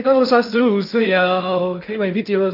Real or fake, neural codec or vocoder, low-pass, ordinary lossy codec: fake; codec, 16 kHz in and 24 kHz out, 0.6 kbps, FocalCodec, streaming, 2048 codes; 5.4 kHz; none